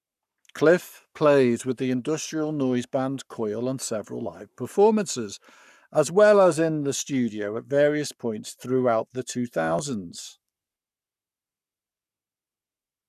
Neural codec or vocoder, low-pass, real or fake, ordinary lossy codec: codec, 44.1 kHz, 7.8 kbps, Pupu-Codec; 14.4 kHz; fake; none